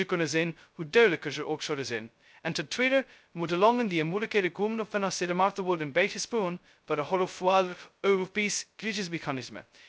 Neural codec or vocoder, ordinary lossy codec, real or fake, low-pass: codec, 16 kHz, 0.2 kbps, FocalCodec; none; fake; none